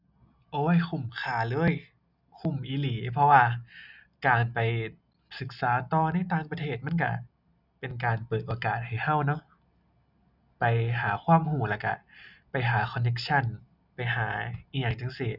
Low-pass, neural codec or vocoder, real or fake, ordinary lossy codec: 5.4 kHz; none; real; none